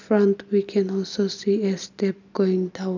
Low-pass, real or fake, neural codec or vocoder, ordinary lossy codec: 7.2 kHz; real; none; none